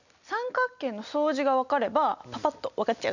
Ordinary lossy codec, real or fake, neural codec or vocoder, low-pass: none; real; none; 7.2 kHz